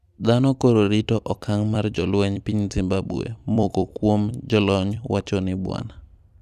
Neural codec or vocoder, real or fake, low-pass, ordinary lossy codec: vocoder, 44.1 kHz, 128 mel bands every 256 samples, BigVGAN v2; fake; 14.4 kHz; none